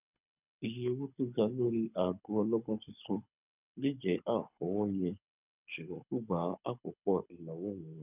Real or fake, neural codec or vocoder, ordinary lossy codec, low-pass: fake; codec, 24 kHz, 6 kbps, HILCodec; none; 3.6 kHz